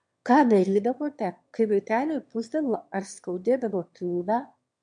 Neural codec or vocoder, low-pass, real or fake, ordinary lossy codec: autoencoder, 22.05 kHz, a latent of 192 numbers a frame, VITS, trained on one speaker; 9.9 kHz; fake; MP3, 64 kbps